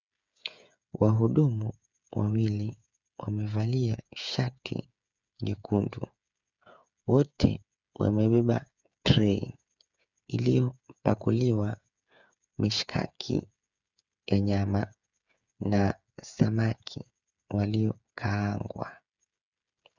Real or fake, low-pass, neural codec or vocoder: fake; 7.2 kHz; codec, 16 kHz, 8 kbps, FreqCodec, smaller model